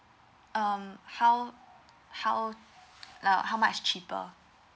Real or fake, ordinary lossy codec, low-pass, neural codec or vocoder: real; none; none; none